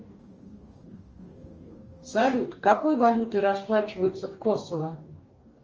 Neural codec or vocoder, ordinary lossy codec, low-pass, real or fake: codec, 44.1 kHz, 2.6 kbps, DAC; Opus, 24 kbps; 7.2 kHz; fake